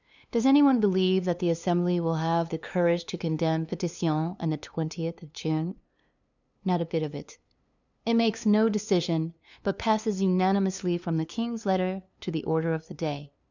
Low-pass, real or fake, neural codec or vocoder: 7.2 kHz; fake; codec, 16 kHz, 2 kbps, FunCodec, trained on LibriTTS, 25 frames a second